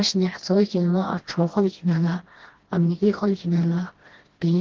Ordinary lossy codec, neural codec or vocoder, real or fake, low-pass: Opus, 16 kbps; codec, 16 kHz, 1 kbps, FreqCodec, smaller model; fake; 7.2 kHz